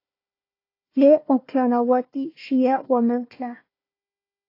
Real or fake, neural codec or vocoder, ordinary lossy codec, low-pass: fake; codec, 16 kHz, 1 kbps, FunCodec, trained on Chinese and English, 50 frames a second; AAC, 32 kbps; 5.4 kHz